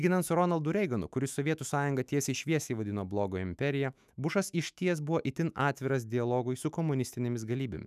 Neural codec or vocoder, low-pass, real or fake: autoencoder, 48 kHz, 128 numbers a frame, DAC-VAE, trained on Japanese speech; 14.4 kHz; fake